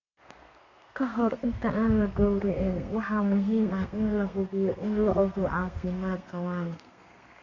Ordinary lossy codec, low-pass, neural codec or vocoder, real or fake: none; 7.2 kHz; codec, 32 kHz, 1.9 kbps, SNAC; fake